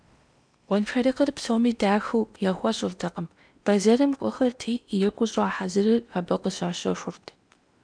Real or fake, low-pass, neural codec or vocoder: fake; 9.9 kHz; codec, 16 kHz in and 24 kHz out, 0.8 kbps, FocalCodec, streaming, 65536 codes